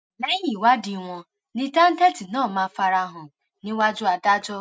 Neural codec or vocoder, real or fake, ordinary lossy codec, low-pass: none; real; none; none